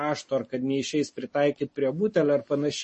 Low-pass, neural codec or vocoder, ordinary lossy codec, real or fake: 10.8 kHz; none; MP3, 32 kbps; real